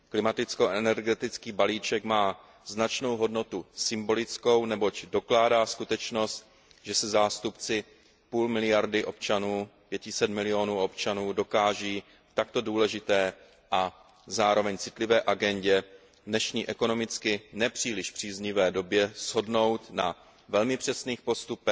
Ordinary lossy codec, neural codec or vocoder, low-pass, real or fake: none; none; none; real